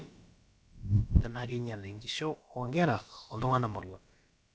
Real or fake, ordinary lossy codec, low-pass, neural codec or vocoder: fake; none; none; codec, 16 kHz, about 1 kbps, DyCAST, with the encoder's durations